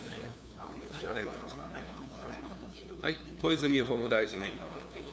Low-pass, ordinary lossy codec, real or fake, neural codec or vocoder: none; none; fake; codec, 16 kHz, 2 kbps, FunCodec, trained on LibriTTS, 25 frames a second